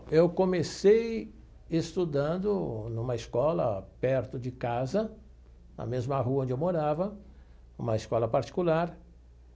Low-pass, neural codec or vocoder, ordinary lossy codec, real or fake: none; none; none; real